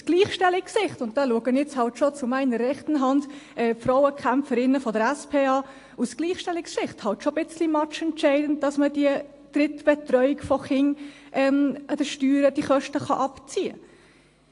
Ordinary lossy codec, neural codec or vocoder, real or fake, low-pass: AAC, 48 kbps; none; real; 10.8 kHz